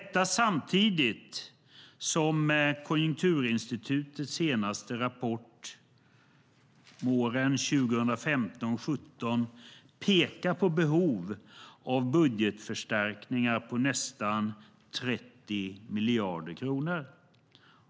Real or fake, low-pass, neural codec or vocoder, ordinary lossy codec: real; none; none; none